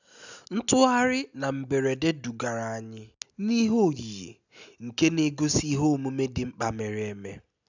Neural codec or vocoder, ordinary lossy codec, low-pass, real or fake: none; none; 7.2 kHz; real